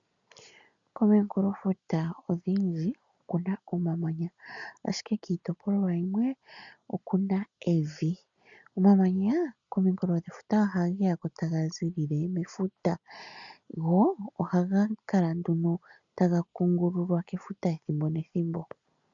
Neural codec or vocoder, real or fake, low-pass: none; real; 7.2 kHz